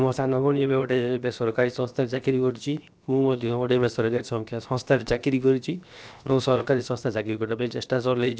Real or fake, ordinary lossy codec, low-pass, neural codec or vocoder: fake; none; none; codec, 16 kHz, 0.7 kbps, FocalCodec